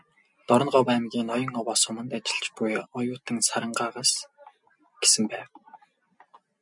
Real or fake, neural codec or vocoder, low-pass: real; none; 10.8 kHz